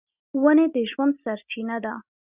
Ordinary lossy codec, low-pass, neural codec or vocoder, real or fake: Opus, 64 kbps; 3.6 kHz; none; real